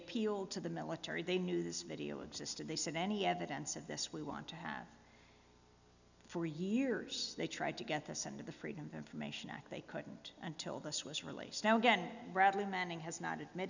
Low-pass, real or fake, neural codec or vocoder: 7.2 kHz; real; none